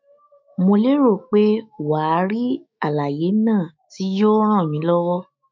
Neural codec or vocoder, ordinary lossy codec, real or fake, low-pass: autoencoder, 48 kHz, 128 numbers a frame, DAC-VAE, trained on Japanese speech; MP3, 48 kbps; fake; 7.2 kHz